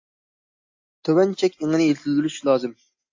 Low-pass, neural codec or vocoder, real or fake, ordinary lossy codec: 7.2 kHz; none; real; AAC, 48 kbps